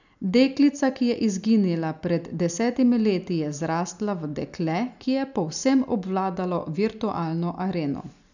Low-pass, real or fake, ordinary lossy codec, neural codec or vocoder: 7.2 kHz; real; none; none